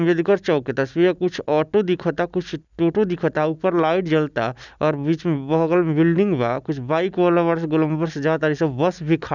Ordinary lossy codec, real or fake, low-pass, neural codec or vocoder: none; real; 7.2 kHz; none